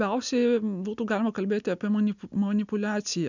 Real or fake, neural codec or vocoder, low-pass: real; none; 7.2 kHz